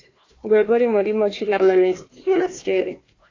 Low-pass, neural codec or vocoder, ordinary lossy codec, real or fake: 7.2 kHz; codec, 16 kHz, 1 kbps, FunCodec, trained on Chinese and English, 50 frames a second; AAC, 32 kbps; fake